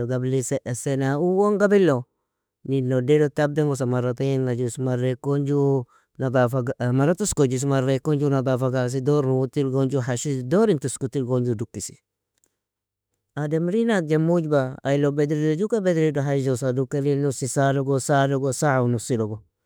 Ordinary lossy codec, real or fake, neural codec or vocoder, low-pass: none; fake; autoencoder, 48 kHz, 32 numbers a frame, DAC-VAE, trained on Japanese speech; none